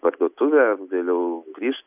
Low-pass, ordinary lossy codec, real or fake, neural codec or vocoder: 3.6 kHz; AAC, 32 kbps; real; none